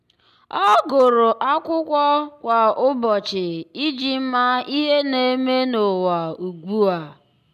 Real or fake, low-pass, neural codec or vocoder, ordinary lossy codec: real; 14.4 kHz; none; none